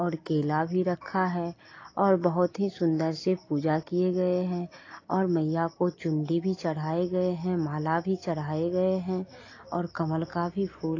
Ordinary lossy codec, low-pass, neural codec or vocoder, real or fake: AAC, 32 kbps; 7.2 kHz; none; real